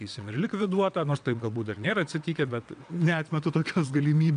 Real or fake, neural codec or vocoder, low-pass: fake; vocoder, 22.05 kHz, 80 mel bands, WaveNeXt; 9.9 kHz